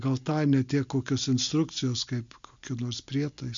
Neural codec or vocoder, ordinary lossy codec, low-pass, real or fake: none; MP3, 64 kbps; 7.2 kHz; real